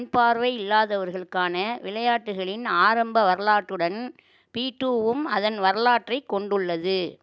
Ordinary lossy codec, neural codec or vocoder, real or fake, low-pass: none; none; real; none